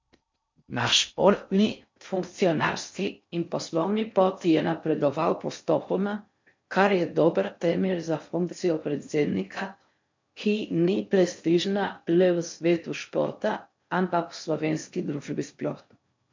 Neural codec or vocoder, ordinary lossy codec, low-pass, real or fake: codec, 16 kHz in and 24 kHz out, 0.6 kbps, FocalCodec, streaming, 4096 codes; MP3, 48 kbps; 7.2 kHz; fake